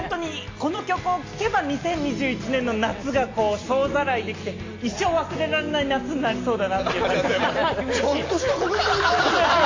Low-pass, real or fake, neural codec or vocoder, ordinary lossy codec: 7.2 kHz; real; none; AAC, 32 kbps